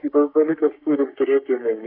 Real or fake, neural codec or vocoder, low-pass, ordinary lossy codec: fake; codec, 44.1 kHz, 3.4 kbps, Pupu-Codec; 5.4 kHz; AAC, 48 kbps